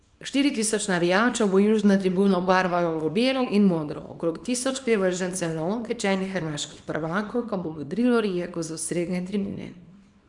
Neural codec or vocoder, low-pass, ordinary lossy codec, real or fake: codec, 24 kHz, 0.9 kbps, WavTokenizer, small release; 10.8 kHz; none; fake